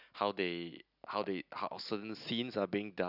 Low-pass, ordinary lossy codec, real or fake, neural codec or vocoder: 5.4 kHz; none; real; none